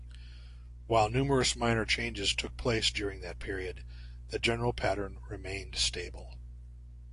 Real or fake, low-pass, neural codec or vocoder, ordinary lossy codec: real; 10.8 kHz; none; AAC, 48 kbps